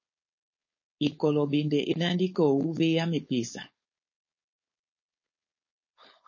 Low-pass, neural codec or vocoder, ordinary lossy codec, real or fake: 7.2 kHz; codec, 16 kHz, 4.8 kbps, FACodec; MP3, 32 kbps; fake